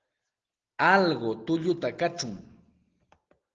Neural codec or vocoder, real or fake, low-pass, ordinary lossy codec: none; real; 7.2 kHz; Opus, 16 kbps